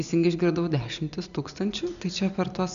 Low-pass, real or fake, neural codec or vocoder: 7.2 kHz; real; none